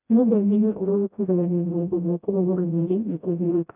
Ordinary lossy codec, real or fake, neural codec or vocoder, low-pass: none; fake; codec, 16 kHz, 0.5 kbps, FreqCodec, smaller model; 3.6 kHz